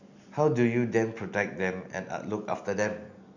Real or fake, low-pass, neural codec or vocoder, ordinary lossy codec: real; 7.2 kHz; none; none